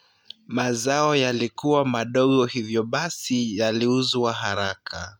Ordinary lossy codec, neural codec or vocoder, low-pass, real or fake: MP3, 96 kbps; none; 19.8 kHz; real